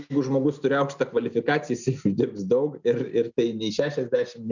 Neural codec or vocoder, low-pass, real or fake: none; 7.2 kHz; real